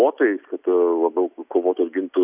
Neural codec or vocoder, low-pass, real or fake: none; 3.6 kHz; real